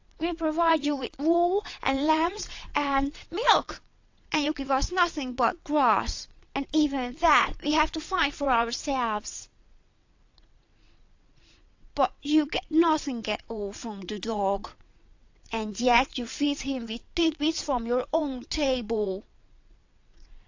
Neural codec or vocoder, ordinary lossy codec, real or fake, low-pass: vocoder, 22.05 kHz, 80 mel bands, WaveNeXt; AAC, 48 kbps; fake; 7.2 kHz